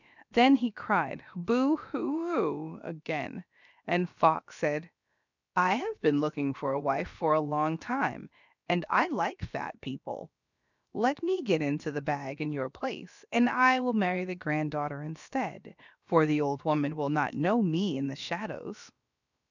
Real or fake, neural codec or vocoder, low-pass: fake; codec, 16 kHz, about 1 kbps, DyCAST, with the encoder's durations; 7.2 kHz